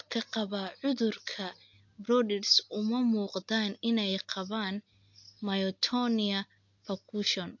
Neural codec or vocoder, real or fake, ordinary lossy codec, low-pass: none; real; MP3, 48 kbps; 7.2 kHz